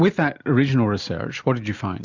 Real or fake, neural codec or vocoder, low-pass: real; none; 7.2 kHz